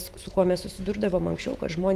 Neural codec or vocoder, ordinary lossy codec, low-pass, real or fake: autoencoder, 48 kHz, 128 numbers a frame, DAC-VAE, trained on Japanese speech; Opus, 24 kbps; 14.4 kHz; fake